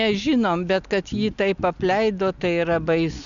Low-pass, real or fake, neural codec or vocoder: 7.2 kHz; real; none